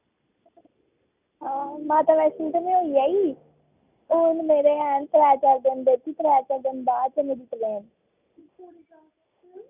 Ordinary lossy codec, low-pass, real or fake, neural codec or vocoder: none; 3.6 kHz; real; none